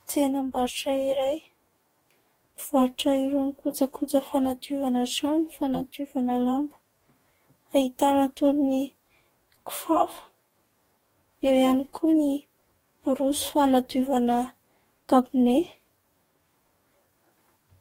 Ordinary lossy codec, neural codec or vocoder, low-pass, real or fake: AAC, 48 kbps; codec, 44.1 kHz, 2.6 kbps, DAC; 19.8 kHz; fake